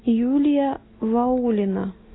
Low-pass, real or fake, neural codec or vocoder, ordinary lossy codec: 7.2 kHz; real; none; AAC, 16 kbps